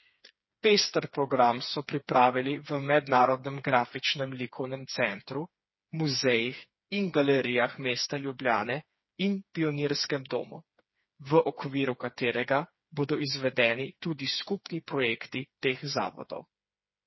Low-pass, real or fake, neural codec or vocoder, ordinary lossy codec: 7.2 kHz; fake; codec, 16 kHz, 4 kbps, FreqCodec, smaller model; MP3, 24 kbps